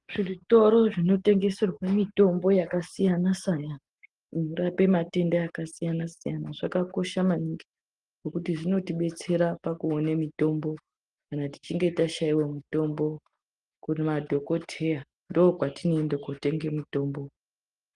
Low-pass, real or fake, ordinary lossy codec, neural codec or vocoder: 10.8 kHz; real; Opus, 24 kbps; none